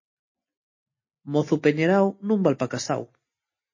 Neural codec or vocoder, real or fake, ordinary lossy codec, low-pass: none; real; MP3, 32 kbps; 7.2 kHz